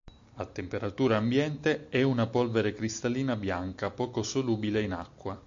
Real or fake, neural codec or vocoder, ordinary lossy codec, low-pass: real; none; AAC, 64 kbps; 7.2 kHz